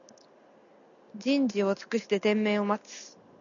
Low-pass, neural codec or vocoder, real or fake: 7.2 kHz; none; real